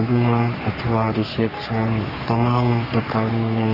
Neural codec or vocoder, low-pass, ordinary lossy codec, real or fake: codec, 44.1 kHz, 3.4 kbps, Pupu-Codec; 5.4 kHz; Opus, 32 kbps; fake